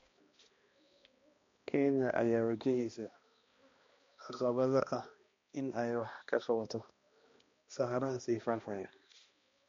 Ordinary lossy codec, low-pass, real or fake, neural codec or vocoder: MP3, 48 kbps; 7.2 kHz; fake; codec, 16 kHz, 1 kbps, X-Codec, HuBERT features, trained on balanced general audio